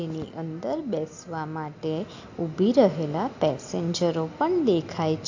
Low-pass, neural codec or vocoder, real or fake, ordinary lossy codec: 7.2 kHz; none; real; AAC, 48 kbps